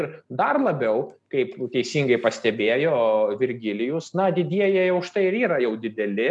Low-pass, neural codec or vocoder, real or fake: 10.8 kHz; none; real